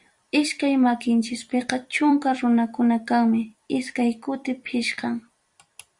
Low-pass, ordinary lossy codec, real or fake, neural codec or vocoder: 10.8 kHz; Opus, 64 kbps; fake; vocoder, 44.1 kHz, 128 mel bands every 256 samples, BigVGAN v2